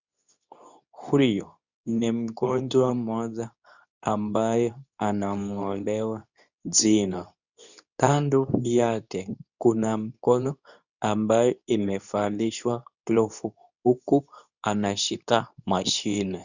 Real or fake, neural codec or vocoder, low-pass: fake; codec, 24 kHz, 0.9 kbps, WavTokenizer, medium speech release version 2; 7.2 kHz